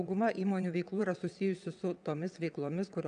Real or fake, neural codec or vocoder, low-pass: fake; vocoder, 22.05 kHz, 80 mel bands, WaveNeXt; 9.9 kHz